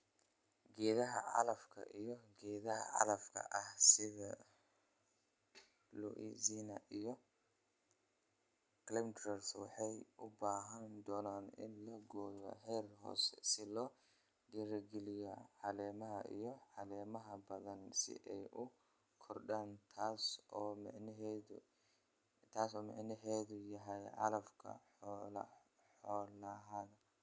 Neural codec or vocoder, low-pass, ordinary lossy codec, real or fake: none; none; none; real